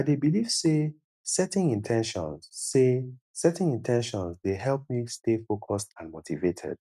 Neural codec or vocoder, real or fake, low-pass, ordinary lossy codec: none; real; 14.4 kHz; none